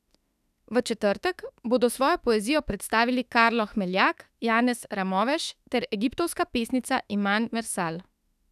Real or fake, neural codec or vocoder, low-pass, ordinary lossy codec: fake; autoencoder, 48 kHz, 32 numbers a frame, DAC-VAE, trained on Japanese speech; 14.4 kHz; none